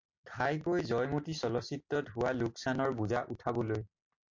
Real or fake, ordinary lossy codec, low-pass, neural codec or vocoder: real; MP3, 48 kbps; 7.2 kHz; none